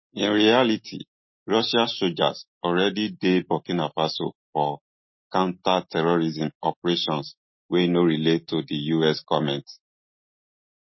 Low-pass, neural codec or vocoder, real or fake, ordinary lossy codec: 7.2 kHz; none; real; MP3, 24 kbps